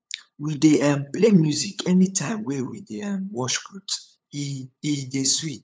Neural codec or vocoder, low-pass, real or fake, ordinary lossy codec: codec, 16 kHz, 8 kbps, FunCodec, trained on LibriTTS, 25 frames a second; none; fake; none